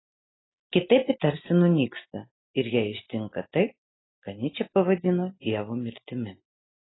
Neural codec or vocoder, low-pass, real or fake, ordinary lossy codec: none; 7.2 kHz; real; AAC, 16 kbps